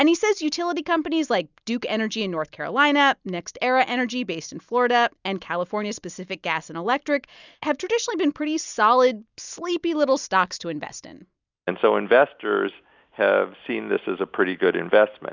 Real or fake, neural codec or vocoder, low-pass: real; none; 7.2 kHz